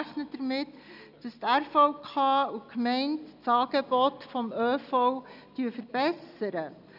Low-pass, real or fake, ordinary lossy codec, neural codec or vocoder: 5.4 kHz; real; none; none